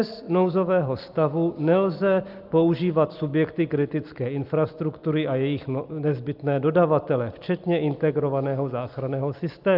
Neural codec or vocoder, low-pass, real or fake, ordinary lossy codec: none; 5.4 kHz; real; Opus, 24 kbps